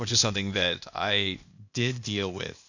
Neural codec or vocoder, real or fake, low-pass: codec, 16 kHz, 0.8 kbps, ZipCodec; fake; 7.2 kHz